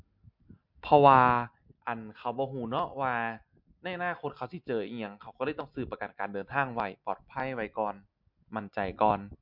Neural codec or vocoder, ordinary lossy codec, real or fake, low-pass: none; MP3, 48 kbps; real; 5.4 kHz